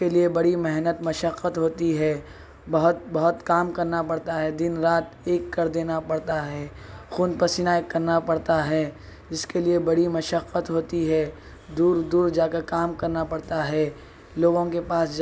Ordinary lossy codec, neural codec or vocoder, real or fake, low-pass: none; none; real; none